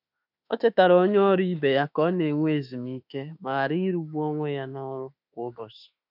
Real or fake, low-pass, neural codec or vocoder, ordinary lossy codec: fake; 5.4 kHz; autoencoder, 48 kHz, 32 numbers a frame, DAC-VAE, trained on Japanese speech; none